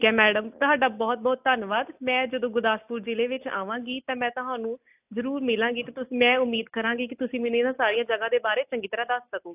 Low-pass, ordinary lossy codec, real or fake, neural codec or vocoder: 3.6 kHz; AAC, 32 kbps; real; none